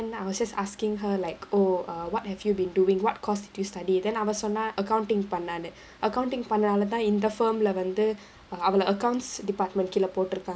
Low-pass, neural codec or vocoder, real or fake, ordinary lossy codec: none; none; real; none